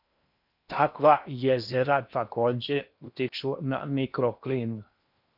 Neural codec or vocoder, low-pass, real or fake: codec, 16 kHz in and 24 kHz out, 0.6 kbps, FocalCodec, streaming, 4096 codes; 5.4 kHz; fake